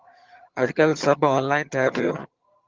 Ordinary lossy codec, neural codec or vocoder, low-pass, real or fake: Opus, 24 kbps; vocoder, 22.05 kHz, 80 mel bands, HiFi-GAN; 7.2 kHz; fake